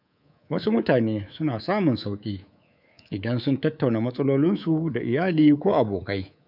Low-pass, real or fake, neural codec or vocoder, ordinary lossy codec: 5.4 kHz; fake; codec, 24 kHz, 3.1 kbps, DualCodec; none